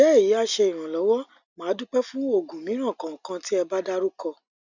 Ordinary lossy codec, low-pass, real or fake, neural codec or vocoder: none; 7.2 kHz; real; none